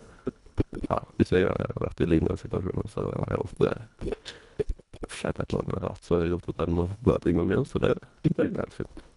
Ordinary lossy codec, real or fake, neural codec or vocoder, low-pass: none; fake; codec, 24 kHz, 1.5 kbps, HILCodec; 10.8 kHz